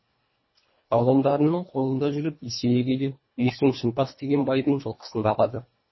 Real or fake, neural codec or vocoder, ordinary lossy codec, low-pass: fake; codec, 24 kHz, 1.5 kbps, HILCodec; MP3, 24 kbps; 7.2 kHz